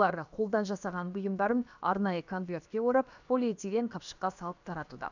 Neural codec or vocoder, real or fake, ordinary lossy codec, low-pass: codec, 16 kHz, about 1 kbps, DyCAST, with the encoder's durations; fake; none; 7.2 kHz